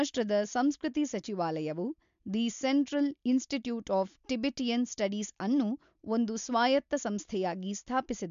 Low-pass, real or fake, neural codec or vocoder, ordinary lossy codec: 7.2 kHz; real; none; MP3, 64 kbps